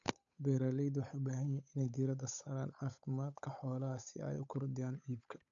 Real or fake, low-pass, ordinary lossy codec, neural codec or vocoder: fake; 7.2 kHz; none; codec, 16 kHz, 8 kbps, FunCodec, trained on Chinese and English, 25 frames a second